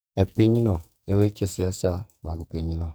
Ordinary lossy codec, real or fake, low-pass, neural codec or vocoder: none; fake; none; codec, 44.1 kHz, 2.6 kbps, SNAC